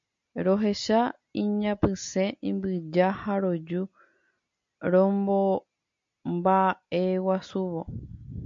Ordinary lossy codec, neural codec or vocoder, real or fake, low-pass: MP3, 48 kbps; none; real; 7.2 kHz